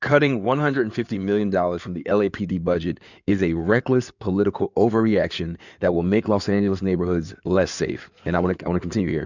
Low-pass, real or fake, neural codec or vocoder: 7.2 kHz; fake; codec, 16 kHz in and 24 kHz out, 2.2 kbps, FireRedTTS-2 codec